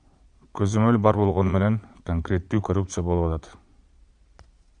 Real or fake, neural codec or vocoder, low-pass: fake; vocoder, 22.05 kHz, 80 mel bands, Vocos; 9.9 kHz